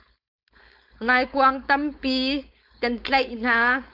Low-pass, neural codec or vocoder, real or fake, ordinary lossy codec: 5.4 kHz; codec, 16 kHz, 4.8 kbps, FACodec; fake; AAC, 48 kbps